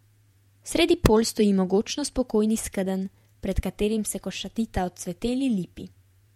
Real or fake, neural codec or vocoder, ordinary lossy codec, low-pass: fake; vocoder, 48 kHz, 128 mel bands, Vocos; MP3, 64 kbps; 19.8 kHz